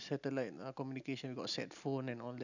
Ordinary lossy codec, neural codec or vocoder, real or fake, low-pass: none; none; real; 7.2 kHz